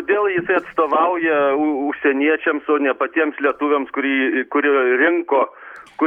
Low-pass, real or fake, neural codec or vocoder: 19.8 kHz; real; none